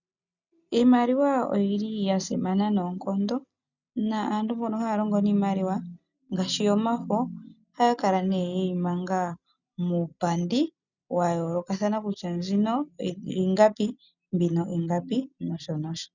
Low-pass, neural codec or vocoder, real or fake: 7.2 kHz; none; real